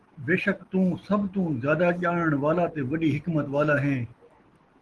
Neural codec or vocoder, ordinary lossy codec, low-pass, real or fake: none; Opus, 16 kbps; 10.8 kHz; real